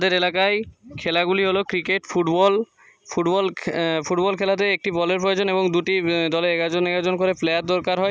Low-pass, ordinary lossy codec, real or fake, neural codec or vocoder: none; none; real; none